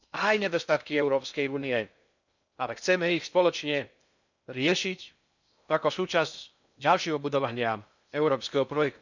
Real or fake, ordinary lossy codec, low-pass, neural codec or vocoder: fake; none; 7.2 kHz; codec, 16 kHz in and 24 kHz out, 0.6 kbps, FocalCodec, streaming, 2048 codes